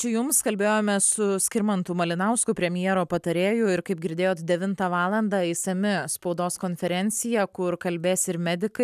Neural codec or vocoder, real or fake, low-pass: none; real; 14.4 kHz